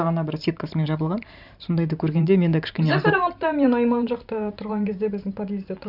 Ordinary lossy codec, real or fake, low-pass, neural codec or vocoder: none; fake; 5.4 kHz; vocoder, 44.1 kHz, 128 mel bands every 512 samples, BigVGAN v2